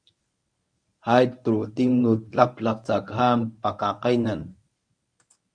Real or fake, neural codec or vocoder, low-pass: fake; codec, 24 kHz, 0.9 kbps, WavTokenizer, medium speech release version 1; 9.9 kHz